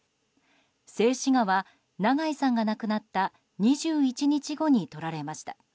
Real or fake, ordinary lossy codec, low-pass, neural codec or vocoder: real; none; none; none